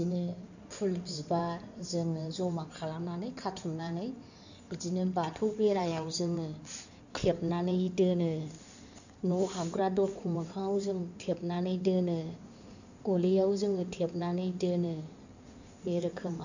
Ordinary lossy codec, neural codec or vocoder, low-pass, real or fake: none; codec, 16 kHz in and 24 kHz out, 2.2 kbps, FireRedTTS-2 codec; 7.2 kHz; fake